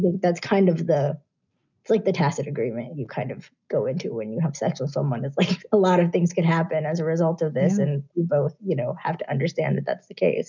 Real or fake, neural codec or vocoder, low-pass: real; none; 7.2 kHz